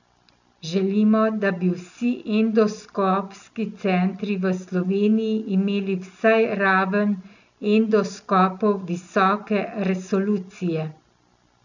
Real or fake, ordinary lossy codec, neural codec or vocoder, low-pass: real; none; none; 7.2 kHz